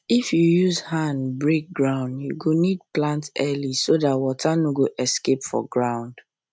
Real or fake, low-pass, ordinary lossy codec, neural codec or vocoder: real; none; none; none